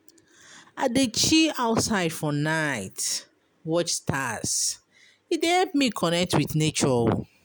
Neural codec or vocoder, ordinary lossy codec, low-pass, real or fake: none; none; none; real